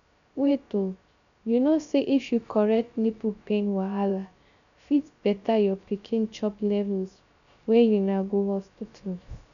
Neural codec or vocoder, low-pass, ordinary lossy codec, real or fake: codec, 16 kHz, 0.3 kbps, FocalCodec; 7.2 kHz; none; fake